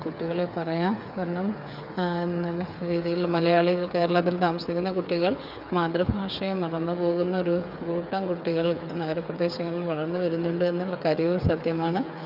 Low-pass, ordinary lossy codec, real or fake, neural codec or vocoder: 5.4 kHz; none; fake; codec, 16 kHz, 8 kbps, FreqCodec, smaller model